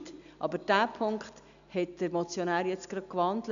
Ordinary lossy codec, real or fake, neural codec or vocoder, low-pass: none; real; none; 7.2 kHz